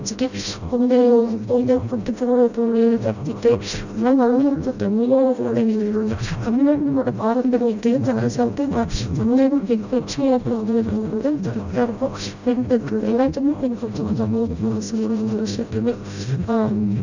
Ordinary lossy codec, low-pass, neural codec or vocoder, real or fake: none; 7.2 kHz; codec, 16 kHz, 0.5 kbps, FreqCodec, smaller model; fake